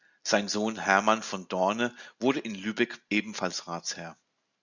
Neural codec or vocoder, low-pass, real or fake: none; 7.2 kHz; real